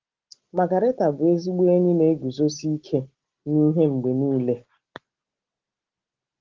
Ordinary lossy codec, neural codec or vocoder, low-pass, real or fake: Opus, 16 kbps; none; 7.2 kHz; real